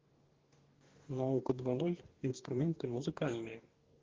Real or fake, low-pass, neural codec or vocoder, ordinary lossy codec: fake; 7.2 kHz; codec, 44.1 kHz, 2.6 kbps, DAC; Opus, 16 kbps